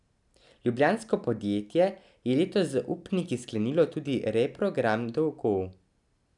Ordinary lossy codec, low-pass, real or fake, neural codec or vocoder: none; 10.8 kHz; real; none